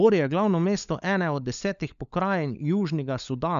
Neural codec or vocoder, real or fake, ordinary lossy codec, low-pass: codec, 16 kHz, 16 kbps, FunCodec, trained on LibriTTS, 50 frames a second; fake; none; 7.2 kHz